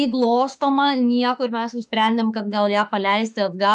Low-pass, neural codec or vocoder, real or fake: 10.8 kHz; autoencoder, 48 kHz, 32 numbers a frame, DAC-VAE, trained on Japanese speech; fake